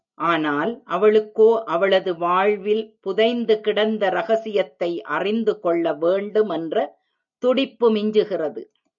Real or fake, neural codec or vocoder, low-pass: real; none; 7.2 kHz